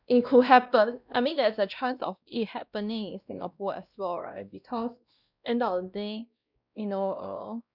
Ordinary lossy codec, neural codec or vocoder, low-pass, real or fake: none; codec, 16 kHz, 1 kbps, X-Codec, WavLM features, trained on Multilingual LibriSpeech; 5.4 kHz; fake